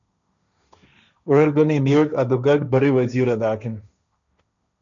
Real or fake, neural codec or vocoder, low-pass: fake; codec, 16 kHz, 1.1 kbps, Voila-Tokenizer; 7.2 kHz